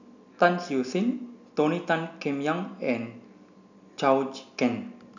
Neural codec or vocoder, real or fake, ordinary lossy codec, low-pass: none; real; none; 7.2 kHz